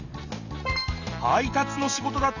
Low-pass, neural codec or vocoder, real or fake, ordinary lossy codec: 7.2 kHz; none; real; none